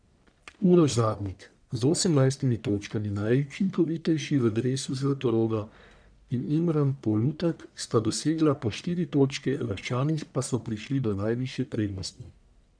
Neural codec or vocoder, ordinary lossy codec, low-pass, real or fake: codec, 44.1 kHz, 1.7 kbps, Pupu-Codec; none; 9.9 kHz; fake